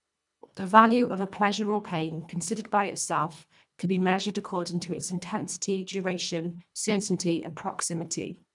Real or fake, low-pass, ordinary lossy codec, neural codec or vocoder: fake; 10.8 kHz; none; codec, 24 kHz, 1.5 kbps, HILCodec